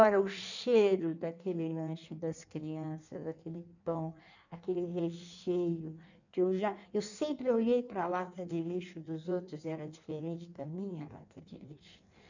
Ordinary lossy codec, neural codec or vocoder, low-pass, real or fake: none; codec, 16 kHz in and 24 kHz out, 1.1 kbps, FireRedTTS-2 codec; 7.2 kHz; fake